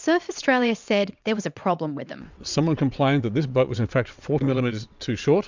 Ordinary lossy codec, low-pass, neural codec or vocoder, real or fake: MP3, 64 kbps; 7.2 kHz; vocoder, 22.05 kHz, 80 mel bands, WaveNeXt; fake